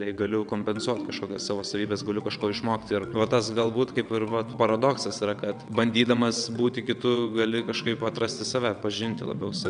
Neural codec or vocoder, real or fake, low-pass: vocoder, 22.05 kHz, 80 mel bands, WaveNeXt; fake; 9.9 kHz